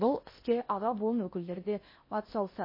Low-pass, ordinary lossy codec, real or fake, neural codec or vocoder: 5.4 kHz; MP3, 24 kbps; fake; codec, 16 kHz in and 24 kHz out, 0.6 kbps, FocalCodec, streaming, 2048 codes